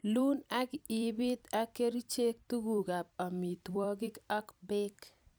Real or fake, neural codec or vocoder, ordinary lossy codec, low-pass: fake; vocoder, 44.1 kHz, 128 mel bands every 256 samples, BigVGAN v2; none; none